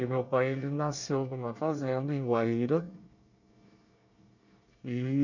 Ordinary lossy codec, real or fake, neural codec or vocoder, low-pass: none; fake; codec, 24 kHz, 1 kbps, SNAC; 7.2 kHz